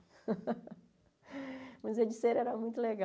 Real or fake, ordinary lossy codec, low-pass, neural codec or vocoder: real; none; none; none